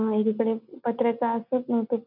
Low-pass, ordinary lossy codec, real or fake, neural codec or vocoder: 5.4 kHz; none; real; none